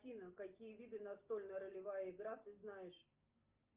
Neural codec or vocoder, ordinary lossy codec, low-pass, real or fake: none; Opus, 24 kbps; 3.6 kHz; real